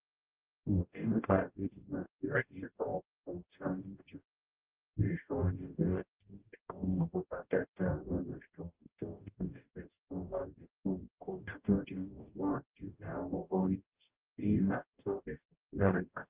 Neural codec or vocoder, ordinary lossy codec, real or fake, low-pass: codec, 44.1 kHz, 0.9 kbps, DAC; Opus, 32 kbps; fake; 3.6 kHz